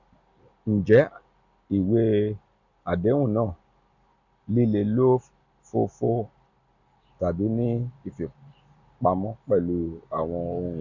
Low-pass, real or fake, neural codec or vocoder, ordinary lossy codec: 7.2 kHz; real; none; none